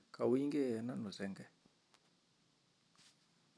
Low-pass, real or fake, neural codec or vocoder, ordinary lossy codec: none; real; none; none